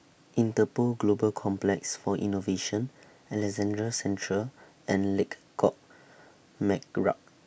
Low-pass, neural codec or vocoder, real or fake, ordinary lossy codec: none; none; real; none